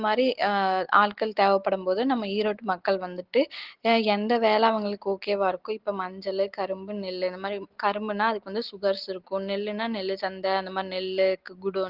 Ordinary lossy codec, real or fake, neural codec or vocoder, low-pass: Opus, 16 kbps; real; none; 5.4 kHz